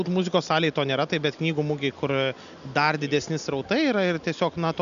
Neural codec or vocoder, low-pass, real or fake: none; 7.2 kHz; real